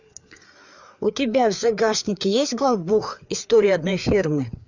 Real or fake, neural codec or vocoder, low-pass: fake; codec, 16 kHz, 4 kbps, FreqCodec, larger model; 7.2 kHz